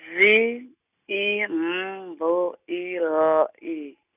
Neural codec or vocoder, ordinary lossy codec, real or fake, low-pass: none; none; real; 3.6 kHz